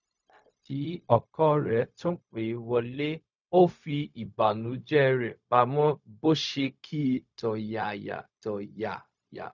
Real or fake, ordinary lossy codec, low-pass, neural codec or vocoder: fake; none; 7.2 kHz; codec, 16 kHz, 0.4 kbps, LongCat-Audio-Codec